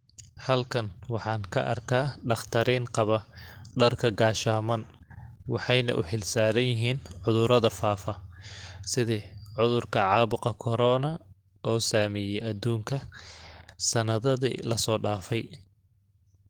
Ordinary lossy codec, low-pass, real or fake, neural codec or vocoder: Opus, 24 kbps; 19.8 kHz; fake; codec, 44.1 kHz, 7.8 kbps, DAC